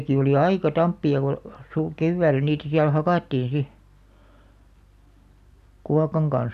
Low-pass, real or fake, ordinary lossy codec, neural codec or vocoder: 14.4 kHz; real; none; none